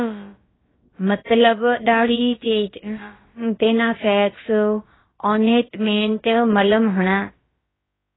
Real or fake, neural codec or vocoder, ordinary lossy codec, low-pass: fake; codec, 16 kHz, about 1 kbps, DyCAST, with the encoder's durations; AAC, 16 kbps; 7.2 kHz